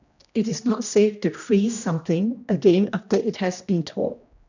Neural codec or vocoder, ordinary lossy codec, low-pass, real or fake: codec, 16 kHz, 1 kbps, X-Codec, HuBERT features, trained on general audio; none; 7.2 kHz; fake